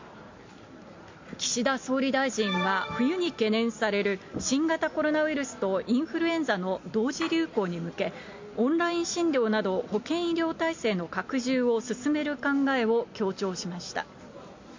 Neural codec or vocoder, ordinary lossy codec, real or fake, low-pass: none; MP3, 48 kbps; real; 7.2 kHz